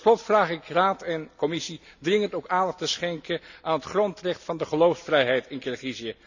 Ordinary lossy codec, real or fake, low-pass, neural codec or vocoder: none; real; 7.2 kHz; none